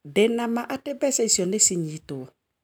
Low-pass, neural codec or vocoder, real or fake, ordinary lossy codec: none; none; real; none